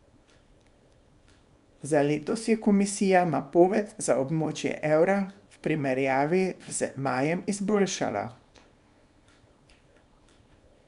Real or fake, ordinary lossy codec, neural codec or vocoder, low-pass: fake; none; codec, 24 kHz, 0.9 kbps, WavTokenizer, small release; 10.8 kHz